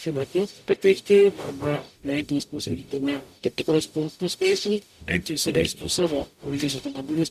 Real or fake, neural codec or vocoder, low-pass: fake; codec, 44.1 kHz, 0.9 kbps, DAC; 14.4 kHz